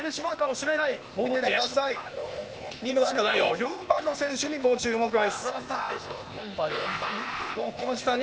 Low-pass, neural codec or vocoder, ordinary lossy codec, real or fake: none; codec, 16 kHz, 0.8 kbps, ZipCodec; none; fake